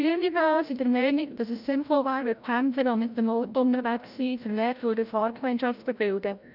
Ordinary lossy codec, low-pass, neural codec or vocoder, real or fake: none; 5.4 kHz; codec, 16 kHz, 0.5 kbps, FreqCodec, larger model; fake